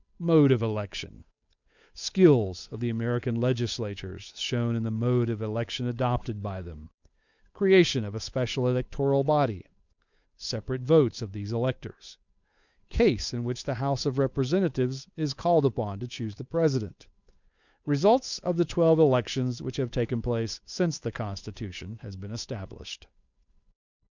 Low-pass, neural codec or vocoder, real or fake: 7.2 kHz; codec, 16 kHz, 8 kbps, FunCodec, trained on Chinese and English, 25 frames a second; fake